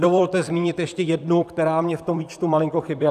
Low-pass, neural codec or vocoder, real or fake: 14.4 kHz; vocoder, 44.1 kHz, 128 mel bands, Pupu-Vocoder; fake